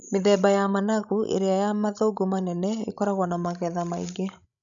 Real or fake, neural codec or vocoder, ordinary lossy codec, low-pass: fake; codec, 16 kHz, 16 kbps, FreqCodec, larger model; none; 7.2 kHz